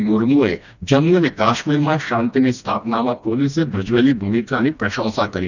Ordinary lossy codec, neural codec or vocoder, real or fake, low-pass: none; codec, 16 kHz, 1 kbps, FreqCodec, smaller model; fake; 7.2 kHz